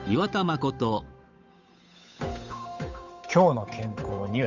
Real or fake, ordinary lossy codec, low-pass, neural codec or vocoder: fake; none; 7.2 kHz; codec, 16 kHz, 8 kbps, FunCodec, trained on Chinese and English, 25 frames a second